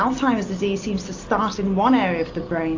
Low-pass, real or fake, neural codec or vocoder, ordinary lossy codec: 7.2 kHz; real; none; AAC, 48 kbps